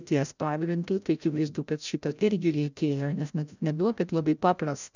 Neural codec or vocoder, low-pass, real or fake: codec, 16 kHz, 0.5 kbps, FreqCodec, larger model; 7.2 kHz; fake